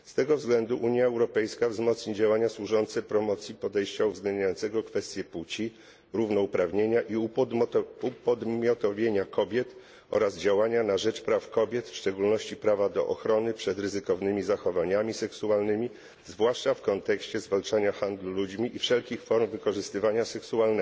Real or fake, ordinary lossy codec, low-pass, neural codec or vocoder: real; none; none; none